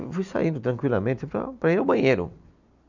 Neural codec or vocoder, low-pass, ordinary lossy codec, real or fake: none; 7.2 kHz; none; real